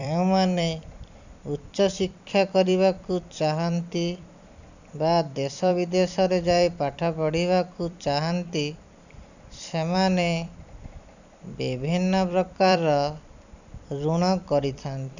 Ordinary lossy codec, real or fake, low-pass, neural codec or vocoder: none; fake; 7.2 kHz; vocoder, 44.1 kHz, 128 mel bands every 256 samples, BigVGAN v2